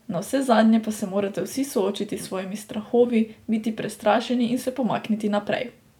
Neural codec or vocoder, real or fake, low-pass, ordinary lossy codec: vocoder, 44.1 kHz, 128 mel bands every 512 samples, BigVGAN v2; fake; 19.8 kHz; none